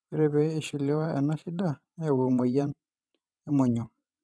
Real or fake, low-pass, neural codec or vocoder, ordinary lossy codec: fake; none; vocoder, 22.05 kHz, 80 mel bands, WaveNeXt; none